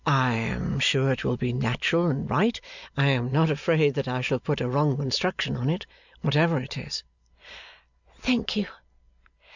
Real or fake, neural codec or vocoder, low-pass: real; none; 7.2 kHz